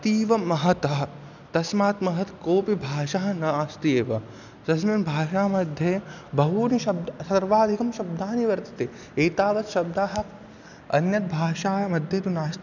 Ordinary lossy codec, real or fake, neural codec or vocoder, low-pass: none; real; none; 7.2 kHz